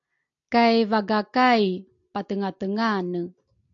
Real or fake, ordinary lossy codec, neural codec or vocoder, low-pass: real; AAC, 64 kbps; none; 7.2 kHz